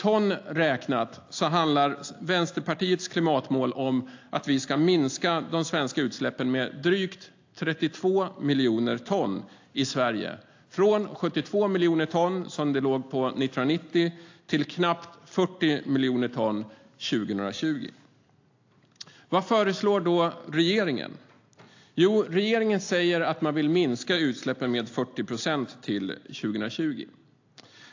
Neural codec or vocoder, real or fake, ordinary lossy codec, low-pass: none; real; AAC, 48 kbps; 7.2 kHz